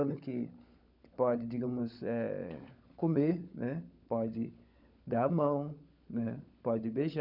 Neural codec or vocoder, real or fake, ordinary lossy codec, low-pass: codec, 16 kHz, 16 kbps, FunCodec, trained on LibriTTS, 50 frames a second; fake; none; 5.4 kHz